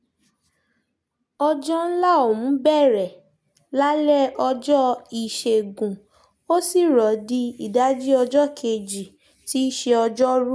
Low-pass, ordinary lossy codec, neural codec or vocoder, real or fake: none; none; none; real